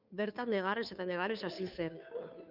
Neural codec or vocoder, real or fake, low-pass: codec, 16 kHz, 2 kbps, FunCodec, trained on Chinese and English, 25 frames a second; fake; 5.4 kHz